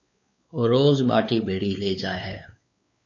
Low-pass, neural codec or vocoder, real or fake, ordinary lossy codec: 7.2 kHz; codec, 16 kHz, 4 kbps, X-Codec, WavLM features, trained on Multilingual LibriSpeech; fake; AAC, 48 kbps